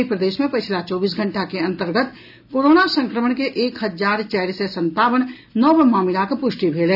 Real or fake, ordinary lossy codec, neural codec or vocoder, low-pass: real; none; none; 5.4 kHz